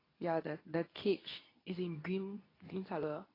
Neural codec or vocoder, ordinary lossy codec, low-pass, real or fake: codec, 24 kHz, 0.9 kbps, WavTokenizer, medium speech release version 2; AAC, 24 kbps; 5.4 kHz; fake